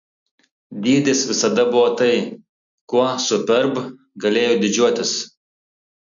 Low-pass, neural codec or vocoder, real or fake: 7.2 kHz; none; real